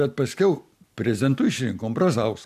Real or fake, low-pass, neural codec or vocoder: real; 14.4 kHz; none